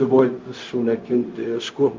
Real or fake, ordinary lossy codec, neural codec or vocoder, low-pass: fake; Opus, 32 kbps; codec, 16 kHz, 0.4 kbps, LongCat-Audio-Codec; 7.2 kHz